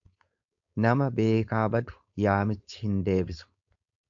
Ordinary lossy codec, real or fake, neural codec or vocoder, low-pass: AAC, 64 kbps; fake; codec, 16 kHz, 4.8 kbps, FACodec; 7.2 kHz